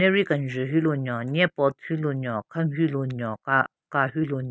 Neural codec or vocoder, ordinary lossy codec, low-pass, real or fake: none; none; none; real